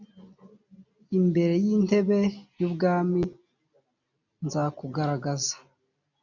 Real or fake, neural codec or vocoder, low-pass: real; none; 7.2 kHz